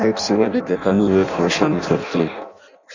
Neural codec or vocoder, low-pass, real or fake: codec, 16 kHz in and 24 kHz out, 0.6 kbps, FireRedTTS-2 codec; 7.2 kHz; fake